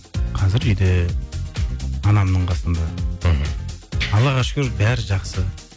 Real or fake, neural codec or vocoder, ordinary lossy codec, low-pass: real; none; none; none